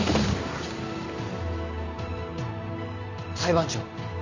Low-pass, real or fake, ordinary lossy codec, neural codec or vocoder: 7.2 kHz; real; Opus, 64 kbps; none